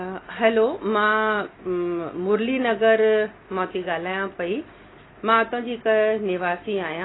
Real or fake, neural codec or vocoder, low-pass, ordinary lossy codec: real; none; 7.2 kHz; AAC, 16 kbps